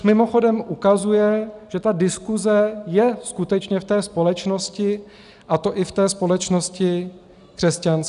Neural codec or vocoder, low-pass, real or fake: none; 10.8 kHz; real